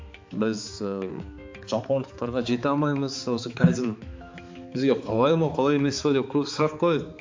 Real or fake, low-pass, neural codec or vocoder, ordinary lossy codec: fake; 7.2 kHz; codec, 16 kHz, 4 kbps, X-Codec, HuBERT features, trained on balanced general audio; MP3, 48 kbps